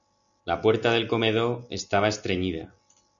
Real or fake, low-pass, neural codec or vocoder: real; 7.2 kHz; none